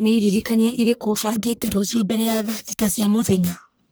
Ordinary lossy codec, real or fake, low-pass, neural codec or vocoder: none; fake; none; codec, 44.1 kHz, 1.7 kbps, Pupu-Codec